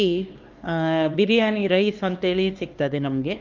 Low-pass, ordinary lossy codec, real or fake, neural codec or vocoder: 7.2 kHz; Opus, 32 kbps; fake; codec, 16 kHz, 2 kbps, X-Codec, HuBERT features, trained on LibriSpeech